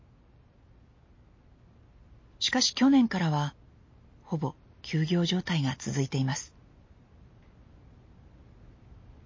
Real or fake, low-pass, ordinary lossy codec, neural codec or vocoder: real; 7.2 kHz; MP3, 32 kbps; none